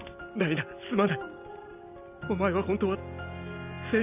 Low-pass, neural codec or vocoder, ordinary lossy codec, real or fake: 3.6 kHz; none; none; real